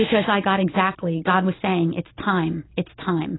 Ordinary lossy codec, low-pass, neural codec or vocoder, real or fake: AAC, 16 kbps; 7.2 kHz; none; real